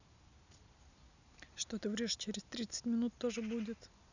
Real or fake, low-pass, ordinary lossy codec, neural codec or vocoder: real; 7.2 kHz; none; none